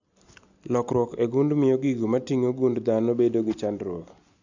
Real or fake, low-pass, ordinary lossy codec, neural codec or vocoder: real; 7.2 kHz; none; none